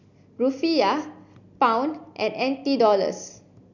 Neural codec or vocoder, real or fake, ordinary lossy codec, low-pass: none; real; none; 7.2 kHz